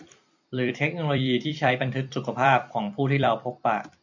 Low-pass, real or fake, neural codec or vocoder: 7.2 kHz; fake; vocoder, 44.1 kHz, 128 mel bands every 256 samples, BigVGAN v2